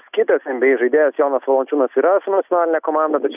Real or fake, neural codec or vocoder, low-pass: real; none; 3.6 kHz